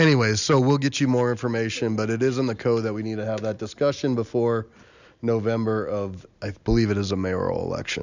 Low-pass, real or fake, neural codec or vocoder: 7.2 kHz; real; none